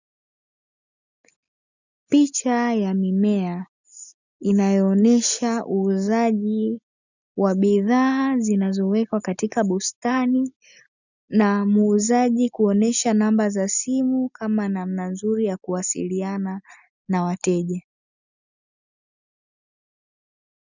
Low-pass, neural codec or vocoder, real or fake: 7.2 kHz; none; real